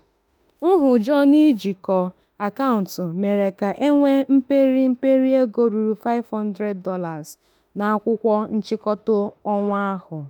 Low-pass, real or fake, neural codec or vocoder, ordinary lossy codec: none; fake; autoencoder, 48 kHz, 32 numbers a frame, DAC-VAE, trained on Japanese speech; none